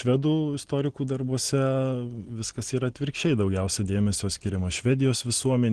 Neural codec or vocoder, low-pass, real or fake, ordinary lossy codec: none; 9.9 kHz; real; Opus, 16 kbps